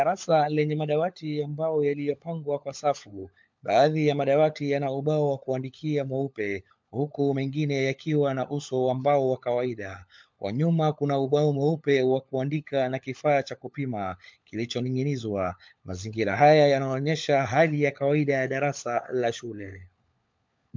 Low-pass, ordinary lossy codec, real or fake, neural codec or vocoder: 7.2 kHz; MP3, 64 kbps; fake; codec, 16 kHz, 16 kbps, FunCodec, trained on LibriTTS, 50 frames a second